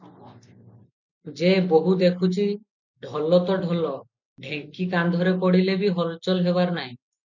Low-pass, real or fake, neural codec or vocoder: 7.2 kHz; real; none